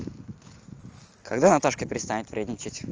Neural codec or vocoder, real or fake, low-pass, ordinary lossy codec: none; real; 7.2 kHz; Opus, 24 kbps